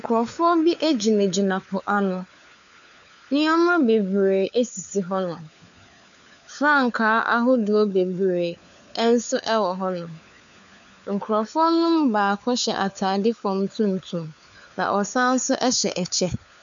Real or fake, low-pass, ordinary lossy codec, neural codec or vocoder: fake; 7.2 kHz; MP3, 96 kbps; codec, 16 kHz, 4 kbps, FunCodec, trained on LibriTTS, 50 frames a second